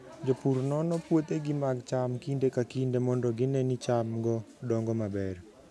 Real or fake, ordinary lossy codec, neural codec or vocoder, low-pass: real; none; none; none